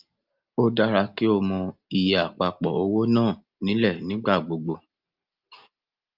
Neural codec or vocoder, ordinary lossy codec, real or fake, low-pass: none; Opus, 24 kbps; real; 5.4 kHz